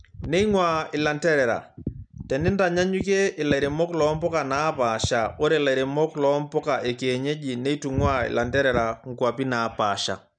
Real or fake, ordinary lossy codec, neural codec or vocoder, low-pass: real; none; none; 9.9 kHz